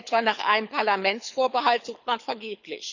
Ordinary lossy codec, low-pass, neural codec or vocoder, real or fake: none; 7.2 kHz; codec, 24 kHz, 6 kbps, HILCodec; fake